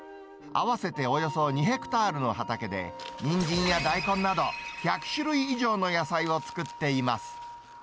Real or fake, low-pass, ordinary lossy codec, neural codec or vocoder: real; none; none; none